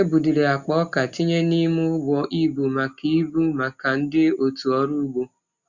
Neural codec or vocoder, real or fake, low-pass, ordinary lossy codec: none; real; 7.2 kHz; Opus, 64 kbps